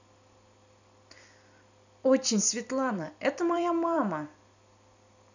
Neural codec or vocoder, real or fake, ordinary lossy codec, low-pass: vocoder, 44.1 kHz, 128 mel bands every 256 samples, BigVGAN v2; fake; none; 7.2 kHz